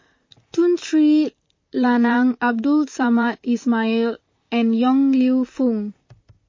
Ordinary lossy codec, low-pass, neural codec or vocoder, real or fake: MP3, 32 kbps; 7.2 kHz; vocoder, 44.1 kHz, 128 mel bands, Pupu-Vocoder; fake